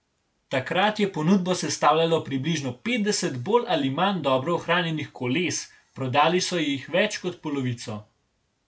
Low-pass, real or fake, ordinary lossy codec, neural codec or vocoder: none; real; none; none